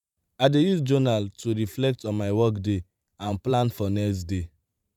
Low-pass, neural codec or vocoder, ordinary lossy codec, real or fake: 19.8 kHz; vocoder, 44.1 kHz, 128 mel bands every 512 samples, BigVGAN v2; none; fake